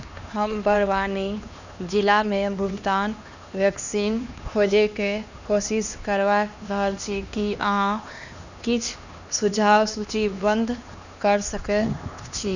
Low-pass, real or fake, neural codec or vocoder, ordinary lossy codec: 7.2 kHz; fake; codec, 16 kHz, 2 kbps, X-Codec, HuBERT features, trained on LibriSpeech; none